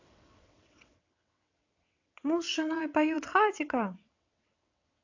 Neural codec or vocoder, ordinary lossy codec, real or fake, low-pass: vocoder, 22.05 kHz, 80 mel bands, WaveNeXt; AAC, 48 kbps; fake; 7.2 kHz